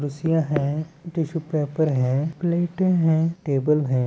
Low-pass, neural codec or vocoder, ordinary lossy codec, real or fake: none; none; none; real